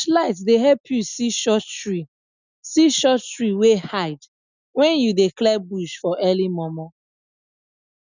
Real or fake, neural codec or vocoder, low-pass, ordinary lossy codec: real; none; 7.2 kHz; none